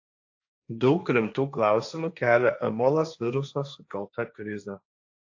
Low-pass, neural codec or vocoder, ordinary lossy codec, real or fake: 7.2 kHz; codec, 16 kHz, 1.1 kbps, Voila-Tokenizer; AAC, 48 kbps; fake